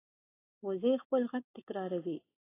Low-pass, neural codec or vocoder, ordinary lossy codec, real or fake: 3.6 kHz; none; AAC, 16 kbps; real